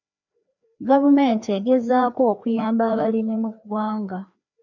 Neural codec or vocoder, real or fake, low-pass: codec, 16 kHz, 2 kbps, FreqCodec, larger model; fake; 7.2 kHz